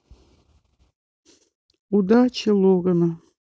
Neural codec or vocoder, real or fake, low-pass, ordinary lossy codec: none; real; none; none